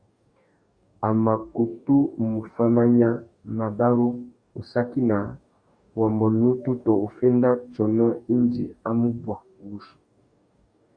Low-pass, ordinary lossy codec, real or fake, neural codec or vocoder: 9.9 kHz; Opus, 64 kbps; fake; codec, 44.1 kHz, 2.6 kbps, DAC